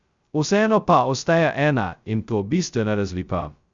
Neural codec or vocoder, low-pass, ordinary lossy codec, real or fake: codec, 16 kHz, 0.2 kbps, FocalCodec; 7.2 kHz; Opus, 64 kbps; fake